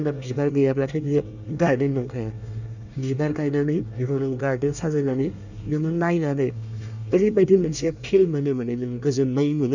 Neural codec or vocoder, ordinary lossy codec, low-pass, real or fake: codec, 24 kHz, 1 kbps, SNAC; none; 7.2 kHz; fake